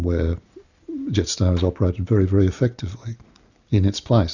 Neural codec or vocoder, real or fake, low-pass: none; real; 7.2 kHz